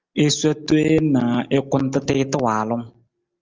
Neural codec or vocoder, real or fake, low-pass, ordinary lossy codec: none; real; 7.2 kHz; Opus, 24 kbps